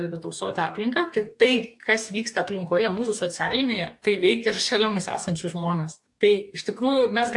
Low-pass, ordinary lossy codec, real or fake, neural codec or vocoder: 10.8 kHz; AAC, 64 kbps; fake; codec, 44.1 kHz, 2.6 kbps, DAC